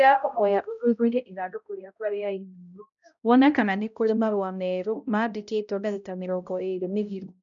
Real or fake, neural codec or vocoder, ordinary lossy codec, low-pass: fake; codec, 16 kHz, 0.5 kbps, X-Codec, HuBERT features, trained on balanced general audio; none; 7.2 kHz